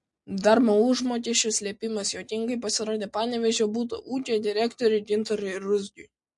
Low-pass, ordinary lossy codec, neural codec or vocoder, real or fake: 10.8 kHz; MP3, 48 kbps; none; real